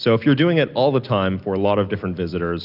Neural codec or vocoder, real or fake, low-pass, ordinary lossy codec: none; real; 5.4 kHz; Opus, 32 kbps